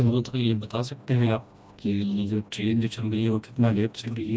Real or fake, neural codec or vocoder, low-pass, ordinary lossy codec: fake; codec, 16 kHz, 1 kbps, FreqCodec, smaller model; none; none